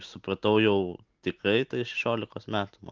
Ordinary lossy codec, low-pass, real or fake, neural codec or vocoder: Opus, 32 kbps; 7.2 kHz; real; none